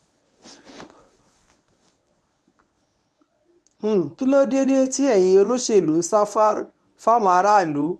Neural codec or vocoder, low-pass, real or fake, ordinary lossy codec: codec, 24 kHz, 0.9 kbps, WavTokenizer, medium speech release version 1; none; fake; none